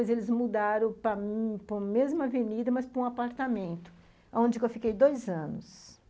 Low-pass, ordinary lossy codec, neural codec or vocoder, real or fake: none; none; none; real